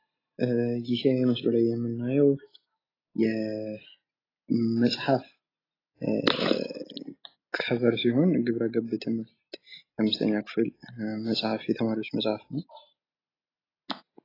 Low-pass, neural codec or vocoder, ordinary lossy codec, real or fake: 5.4 kHz; none; AAC, 24 kbps; real